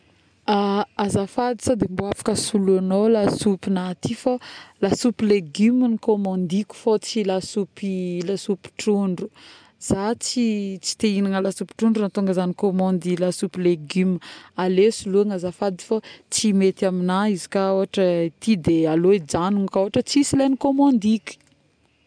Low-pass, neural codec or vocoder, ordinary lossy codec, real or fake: 9.9 kHz; none; none; real